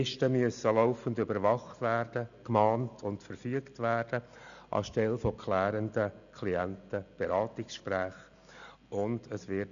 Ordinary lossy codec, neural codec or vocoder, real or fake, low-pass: none; none; real; 7.2 kHz